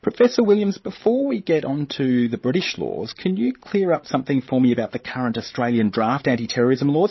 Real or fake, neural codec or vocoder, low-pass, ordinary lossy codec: fake; codec, 16 kHz, 16 kbps, FreqCodec, larger model; 7.2 kHz; MP3, 24 kbps